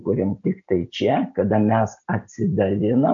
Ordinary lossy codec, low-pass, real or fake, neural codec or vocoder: AAC, 64 kbps; 7.2 kHz; real; none